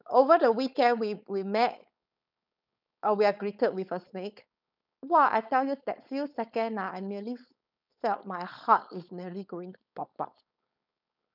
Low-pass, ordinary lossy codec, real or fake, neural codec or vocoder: 5.4 kHz; none; fake; codec, 16 kHz, 4.8 kbps, FACodec